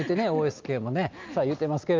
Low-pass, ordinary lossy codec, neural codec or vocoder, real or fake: 7.2 kHz; Opus, 24 kbps; none; real